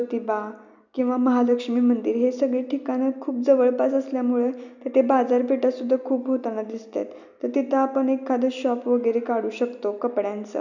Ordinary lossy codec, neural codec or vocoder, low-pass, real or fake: none; none; 7.2 kHz; real